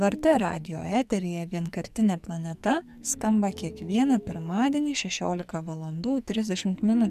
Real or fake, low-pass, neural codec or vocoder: fake; 14.4 kHz; codec, 44.1 kHz, 2.6 kbps, SNAC